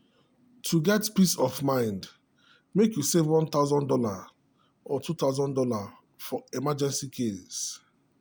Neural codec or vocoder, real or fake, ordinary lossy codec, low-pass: none; real; none; none